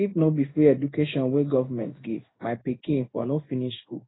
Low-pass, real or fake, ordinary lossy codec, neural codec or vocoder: 7.2 kHz; fake; AAC, 16 kbps; codec, 16 kHz in and 24 kHz out, 1 kbps, XY-Tokenizer